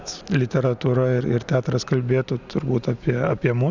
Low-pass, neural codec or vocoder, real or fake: 7.2 kHz; none; real